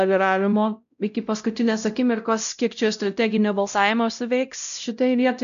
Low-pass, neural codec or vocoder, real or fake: 7.2 kHz; codec, 16 kHz, 0.5 kbps, X-Codec, WavLM features, trained on Multilingual LibriSpeech; fake